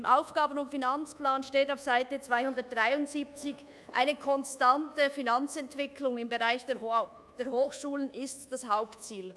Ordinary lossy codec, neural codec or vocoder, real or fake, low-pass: none; codec, 24 kHz, 1.2 kbps, DualCodec; fake; none